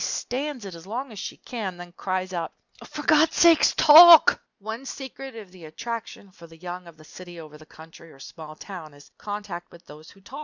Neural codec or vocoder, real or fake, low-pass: none; real; 7.2 kHz